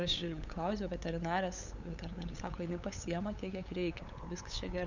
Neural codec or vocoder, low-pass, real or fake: codec, 16 kHz, 8 kbps, FunCodec, trained on LibriTTS, 25 frames a second; 7.2 kHz; fake